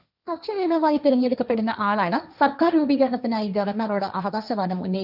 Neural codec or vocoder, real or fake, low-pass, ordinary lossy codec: codec, 16 kHz, 1.1 kbps, Voila-Tokenizer; fake; 5.4 kHz; none